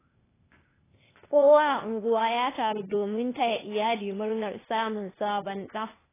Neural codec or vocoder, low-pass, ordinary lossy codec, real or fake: codec, 16 kHz, 0.8 kbps, ZipCodec; 3.6 kHz; AAC, 16 kbps; fake